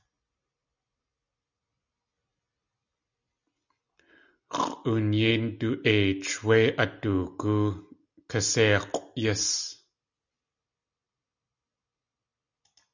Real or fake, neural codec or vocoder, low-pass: real; none; 7.2 kHz